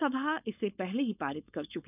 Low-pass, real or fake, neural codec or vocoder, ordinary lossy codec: 3.6 kHz; fake; codec, 16 kHz, 4.8 kbps, FACodec; none